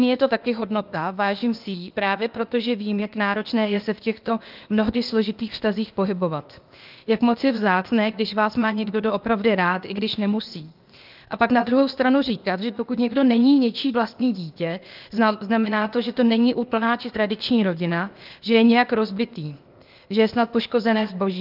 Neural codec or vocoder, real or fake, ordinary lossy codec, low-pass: codec, 16 kHz, 0.8 kbps, ZipCodec; fake; Opus, 24 kbps; 5.4 kHz